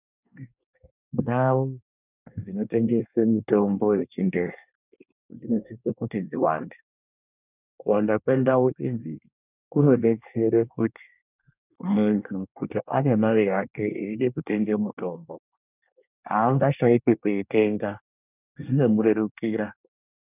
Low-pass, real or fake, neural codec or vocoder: 3.6 kHz; fake; codec, 24 kHz, 1 kbps, SNAC